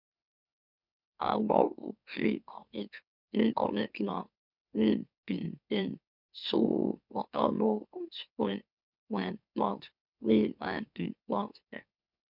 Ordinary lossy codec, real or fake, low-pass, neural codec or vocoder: none; fake; 5.4 kHz; autoencoder, 44.1 kHz, a latent of 192 numbers a frame, MeloTTS